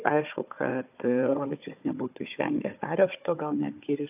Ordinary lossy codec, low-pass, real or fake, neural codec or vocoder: AAC, 32 kbps; 3.6 kHz; fake; codec, 16 kHz, 4 kbps, FunCodec, trained on Chinese and English, 50 frames a second